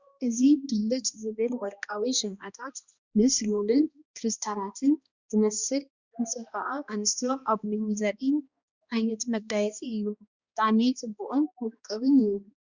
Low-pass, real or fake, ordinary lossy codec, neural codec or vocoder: 7.2 kHz; fake; Opus, 64 kbps; codec, 16 kHz, 1 kbps, X-Codec, HuBERT features, trained on balanced general audio